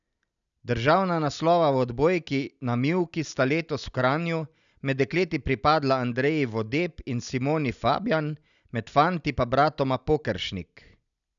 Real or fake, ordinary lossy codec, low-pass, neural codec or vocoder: real; none; 7.2 kHz; none